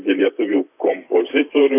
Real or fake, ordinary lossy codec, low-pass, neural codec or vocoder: fake; AAC, 24 kbps; 3.6 kHz; vocoder, 44.1 kHz, 128 mel bands, Pupu-Vocoder